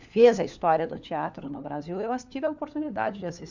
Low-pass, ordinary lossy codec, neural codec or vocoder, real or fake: 7.2 kHz; none; codec, 16 kHz, 4 kbps, FunCodec, trained on LibriTTS, 50 frames a second; fake